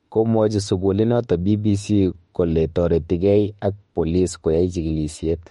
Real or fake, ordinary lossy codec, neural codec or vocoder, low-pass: fake; MP3, 48 kbps; autoencoder, 48 kHz, 32 numbers a frame, DAC-VAE, trained on Japanese speech; 19.8 kHz